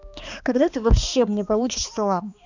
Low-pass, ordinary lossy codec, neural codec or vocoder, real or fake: 7.2 kHz; none; codec, 16 kHz, 2 kbps, X-Codec, HuBERT features, trained on balanced general audio; fake